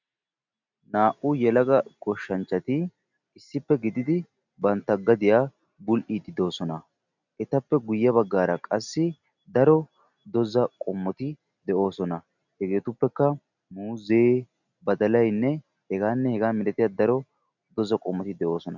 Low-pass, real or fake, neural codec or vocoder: 7.2 kHz; real; none